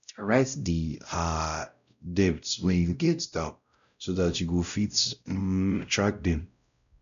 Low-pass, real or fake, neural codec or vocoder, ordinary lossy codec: 7.2 kHz; fake; codec, 16 kHz, 0.5 kbps, X-Codec, WavLM features, trained on Multilingual LibriSpeech; none